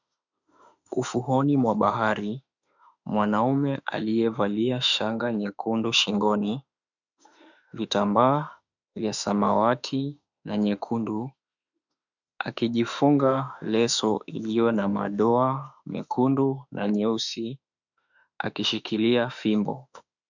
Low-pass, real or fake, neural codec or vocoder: 7.2 kHz; fake; autoencoder, 48 kHz, 32 numbers a frame, DAC-VAE, trained on Japanese speech